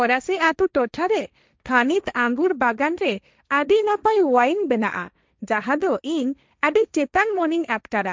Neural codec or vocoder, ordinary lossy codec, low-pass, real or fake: codec, 16 kHz, 1.1 kbps, Voila-Tokenizer; none; none; fake